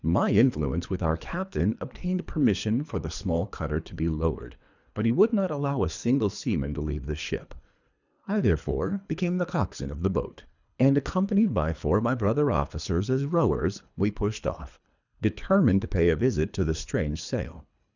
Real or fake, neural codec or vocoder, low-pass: fake; codec, 24 kHz, 3 kbps, HILCodec; 7.2 kHz